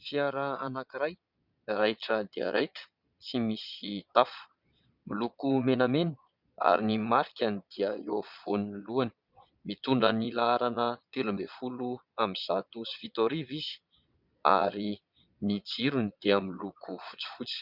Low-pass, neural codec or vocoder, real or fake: 5.4 kHz; vocoder, 22.05 kHz, 80 mel bands, Vocos; fake